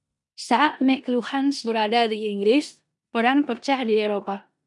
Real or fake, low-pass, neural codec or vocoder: fake; 10.8 kHz; codec, 16 kHz in and 24 kHz out, 0.9 kbps, LongCat-Audio-Codec, four codebook decoder